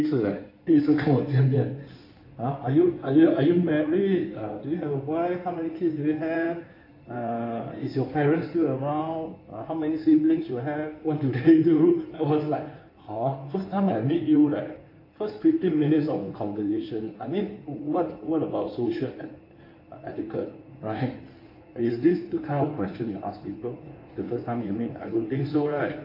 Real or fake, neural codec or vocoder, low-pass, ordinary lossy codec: fake; codec, 16 kHz in and 24 kHz out, 2.2 kbps, FireRedTTS-2 codec; 5.4 kHz; AAC, 32 kbps